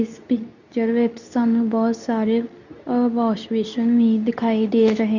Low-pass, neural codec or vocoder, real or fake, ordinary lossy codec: 7.2 kHz; codec, 24 kHz, 0.9 kbps, WavTokenizer, medium speech release version 2; fake; none